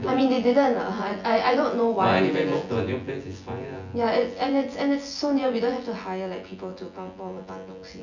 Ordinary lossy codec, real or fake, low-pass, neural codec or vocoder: none; fake; 7.2 kHz; vocoder, 24 kHz, 100 mel bands, Vocos